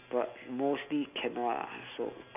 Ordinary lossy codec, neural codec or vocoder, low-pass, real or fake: none; none; 3.6 kHz; real